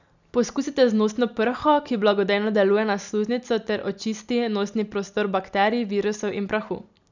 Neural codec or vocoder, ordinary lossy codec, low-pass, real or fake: none; none; 7.2 kHz; real